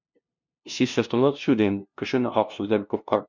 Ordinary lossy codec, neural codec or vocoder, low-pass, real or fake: MP3, 48 kbps; codec, 16 kHz, 0.5 kbps, FunCodec, trained on LibriTTS, 25 frames a second; 7.2 kHz; fake